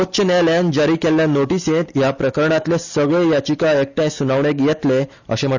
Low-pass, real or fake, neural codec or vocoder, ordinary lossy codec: 7.2 kHz; real; none; none